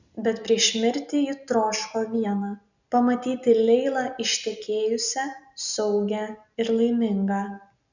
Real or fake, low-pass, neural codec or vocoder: real; 7.2 kHz; none